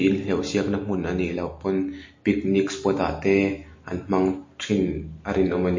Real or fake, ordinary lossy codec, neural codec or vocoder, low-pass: real; MP3, 32 kbps; none; 7.2 kHz